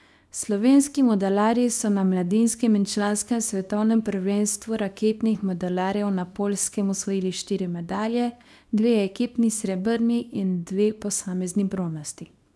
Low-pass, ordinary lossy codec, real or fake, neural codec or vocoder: none; none; fake; codec, 24 kHz, 0.9 kbps, WavTokenizer, small release